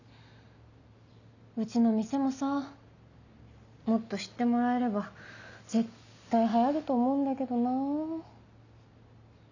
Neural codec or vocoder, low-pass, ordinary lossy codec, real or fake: none; 7.2 kHz; none; real